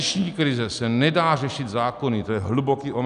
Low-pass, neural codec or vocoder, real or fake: 10.8 kHz; none; real